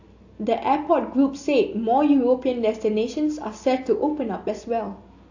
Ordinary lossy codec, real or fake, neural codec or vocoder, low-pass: AAC, 48 kbps; real; none; 7.2 kHz